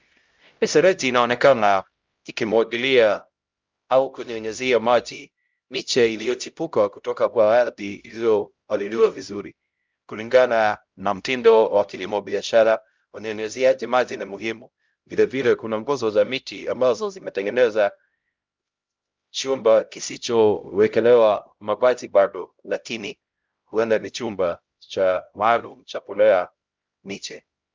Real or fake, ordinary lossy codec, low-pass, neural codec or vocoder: fake; Opus, 24 kbps; 7.2 kHz; codec, 16 kHz, 0.5 kbps, X-Codec, HuBERT features, trained on LibriSpeech